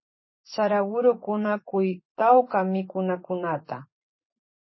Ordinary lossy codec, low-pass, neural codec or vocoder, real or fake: MP3, 24 kbps; 7.2 kHz; vocoder, 24 kHz, 100 mel bands, Vocos; fake